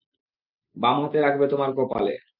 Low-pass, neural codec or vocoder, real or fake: 5.4 kHz; none; real